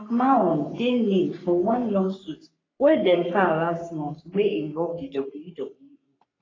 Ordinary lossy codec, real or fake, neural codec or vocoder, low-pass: AAC, 32 kbps; fake; codec, 44.1 kHz, 3.4 kbps, Pupu-Codec; 7.2 kHz